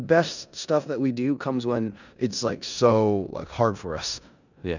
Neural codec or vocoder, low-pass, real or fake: codec, 16 kHz in and 24 kHz out, 0.9 kbps, LongCat-Audio-Codec, four codebook decoder; 7.2 kHz; fake